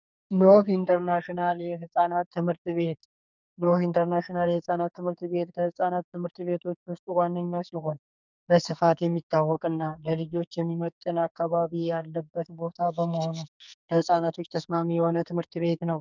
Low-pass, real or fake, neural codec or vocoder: 7.2 kHz; fake; codec, 44.1 kHz, 2.6 kbps, SNAC